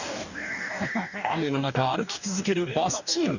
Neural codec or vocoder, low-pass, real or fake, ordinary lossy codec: codec, 44.1 kHz, 2.6 kbps, DAC; 7.2 kHz; fake; none